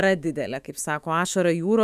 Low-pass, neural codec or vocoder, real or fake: 14.4 kHz; autoencoder, 48 kHz, 128 numbers a frame, DAC-VAE, trained on Japanese speech; fake